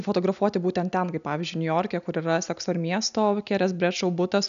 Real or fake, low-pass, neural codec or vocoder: real; 7.2 kHz; none